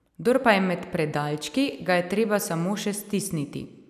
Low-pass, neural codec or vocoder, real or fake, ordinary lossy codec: 14.4 kHz; none; real; none